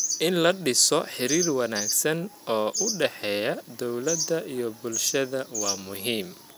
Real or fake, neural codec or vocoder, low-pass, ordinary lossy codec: real; none; none; none